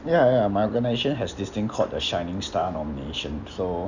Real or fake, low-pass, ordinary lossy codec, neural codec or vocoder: real; 7.2 kHz; none; none